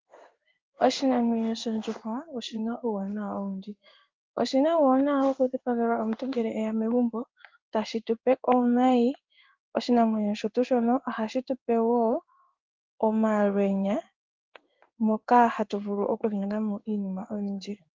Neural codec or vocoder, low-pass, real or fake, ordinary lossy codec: codec, 16 kHz in and 24 kHz out, 1 kbps, XY-Tokenizer; 7.2 kHz; fake; Opus, 32 kbps